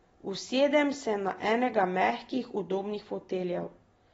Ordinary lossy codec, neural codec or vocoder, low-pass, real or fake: AAC, 24 kbps; none; 19.8 kHz; real